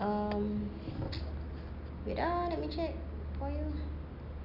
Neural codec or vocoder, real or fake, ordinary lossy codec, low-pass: none; real; none; 5.4 kHz